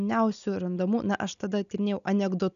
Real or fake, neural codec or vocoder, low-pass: real; none; 7.2 kHz